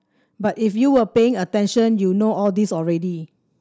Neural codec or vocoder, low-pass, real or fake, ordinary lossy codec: none; none; real; none